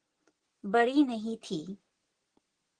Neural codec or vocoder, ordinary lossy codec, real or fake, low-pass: none; Opus, 16 kbps; real; 9.9 kHz